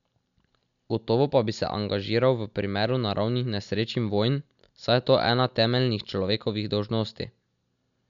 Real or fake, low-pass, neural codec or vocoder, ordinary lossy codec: real; 7.2 kHz; none; none